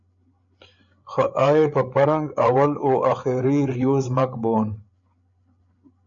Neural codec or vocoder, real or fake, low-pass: codec, 16 kHz, 8 kbps, FreqCodec, larger model; fake; 7.2 kHz